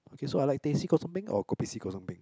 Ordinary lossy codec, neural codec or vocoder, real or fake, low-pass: none; none; real; none